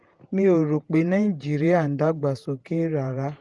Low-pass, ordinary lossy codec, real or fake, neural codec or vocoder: 10.8 kHz; Opus, 24 kbps; fake; vocoder, 48 kHz, 128 mel bands, Vocos